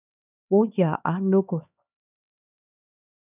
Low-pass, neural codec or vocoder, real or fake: 3.6 kHz; codec, 16 kHz, 2 kbps, X-Codec, HuBERT features, trained on LibriSpeech; fake